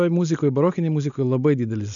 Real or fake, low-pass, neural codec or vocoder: real; 7.2 kHz; none